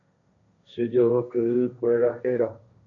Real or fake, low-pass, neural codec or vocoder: fake; 7.2 kHz; codec, 16 kHz, 1.1 kbps, Voila-Tokenizer